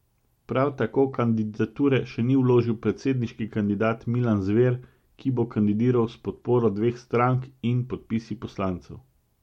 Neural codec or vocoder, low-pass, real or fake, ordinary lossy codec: none; 19.8 kHz; real; MP3, 64 kbps